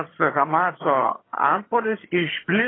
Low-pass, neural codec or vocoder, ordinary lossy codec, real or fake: 7.2 kHz; vocoder, 22.05 kHz, 80 mel bands, HiFi-GAN; AAC, 16 kbps; fake